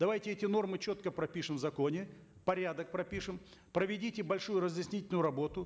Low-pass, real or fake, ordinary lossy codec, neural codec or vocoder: none; real; none; none